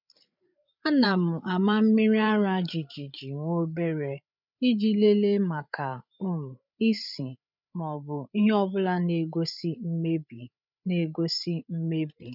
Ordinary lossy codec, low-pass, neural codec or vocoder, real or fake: none; 5.4 kHz; codec, 16 kHz, 8 kbps, FreqCodec, larger model; fake